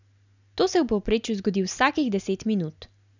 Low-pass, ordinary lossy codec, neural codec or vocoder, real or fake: 7.2 kHz; none; none; real